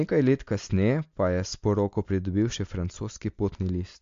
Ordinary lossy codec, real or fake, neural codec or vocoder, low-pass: MP3, 48 kbps; real; none; 7.2 kHz